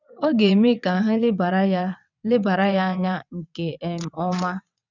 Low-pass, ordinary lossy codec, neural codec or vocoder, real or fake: 7.2 kHz; none; vocoder, 22.05 kHz, 80 mel bands, WaveNeXt; fake